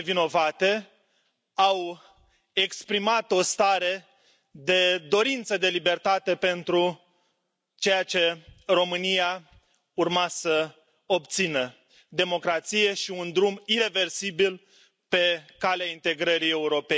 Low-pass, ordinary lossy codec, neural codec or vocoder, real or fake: none; none; none; real